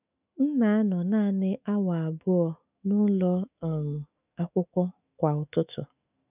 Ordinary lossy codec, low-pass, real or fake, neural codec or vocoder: none; 3.6 kHz; fake; autoencoder, 48 kHz, 128 numbers a frame, DAC-VAE, trained on Japanese speech